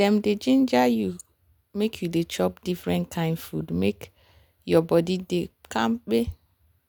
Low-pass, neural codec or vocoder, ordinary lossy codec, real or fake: none; none; none; real